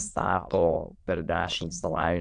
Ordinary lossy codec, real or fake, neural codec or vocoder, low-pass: AAC, 64 kbps; fake; autoencoder, 22.05 kHz, a latent of 192 numbers a frame, VITS, trained on many speakers; 9.9 kHz